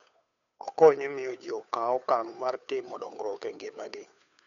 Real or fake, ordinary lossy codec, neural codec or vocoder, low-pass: fake; none; codec, 16 kHz, 2 kbps, FunCodec, trained on Chinese and English, 25 frames a second; 7.2 kHz